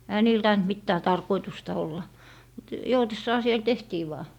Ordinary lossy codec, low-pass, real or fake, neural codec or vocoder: none; 19.8 kHz; real; none